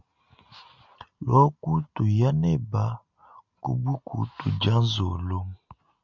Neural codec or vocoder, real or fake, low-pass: none; real; 7.2 kHz